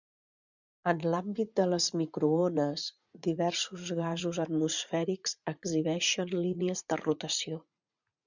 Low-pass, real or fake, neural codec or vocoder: 7.2 kHz; fake; vocoder, 44.1 kHz, 80 mel bands, Vocos